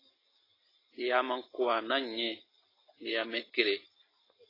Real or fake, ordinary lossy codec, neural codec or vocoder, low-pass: real; AAC, 24 kbps; none; 5.4 kHz